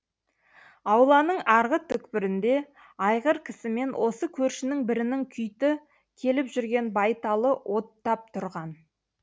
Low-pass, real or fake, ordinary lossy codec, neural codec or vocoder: none; real; none; none